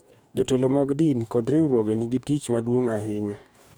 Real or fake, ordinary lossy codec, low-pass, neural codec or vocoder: fake; none; none; codec, 44.1 kHz, 2.6 kbps, DAC